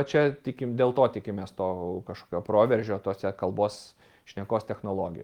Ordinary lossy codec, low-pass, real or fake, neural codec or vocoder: Opus, 24 kbps; 19.8 kHz; real; none